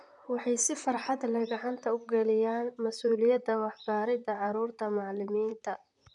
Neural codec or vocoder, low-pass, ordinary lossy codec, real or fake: vocoder, 44.1 kHz, 128 mel bands, Pupu-Vocoder; 10.8 kHz; none; fake